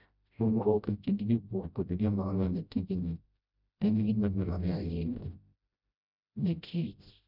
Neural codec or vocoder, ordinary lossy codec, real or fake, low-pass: codec, 16 kHz, 0.5 kbps, FreqCodec, smaller model; none; fake; 5.4 kHz